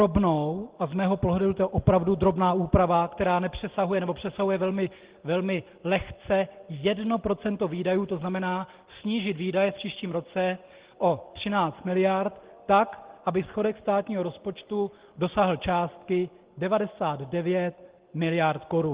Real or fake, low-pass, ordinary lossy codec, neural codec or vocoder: real; 3.6 kHz; Opus, 16 kbps; none